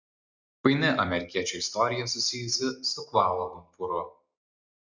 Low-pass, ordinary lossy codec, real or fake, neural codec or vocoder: 7.2 kHz; Opus, 64 kbps; real; none